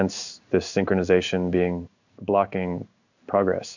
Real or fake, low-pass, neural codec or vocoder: fake; 7.2 kHz; codec, 16 kHz in and 24 kHz out, 1 kbps, XY-Tokenizer